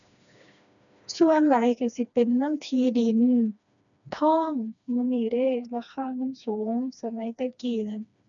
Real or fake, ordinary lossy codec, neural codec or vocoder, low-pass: fake; none; codec, 16 kHz, 2 kbps, FreqCodec, smaller model; 7.2 kHz